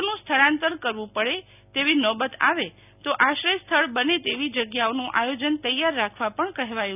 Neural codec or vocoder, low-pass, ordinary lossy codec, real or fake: none; 3.6 kHz; none; real